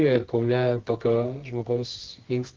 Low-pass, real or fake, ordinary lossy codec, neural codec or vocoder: 7.2 kHz; fake; Opus, 16 kbps; codec, 24 kHz, 0.9 kbps, WavTokenizer, medium music audio release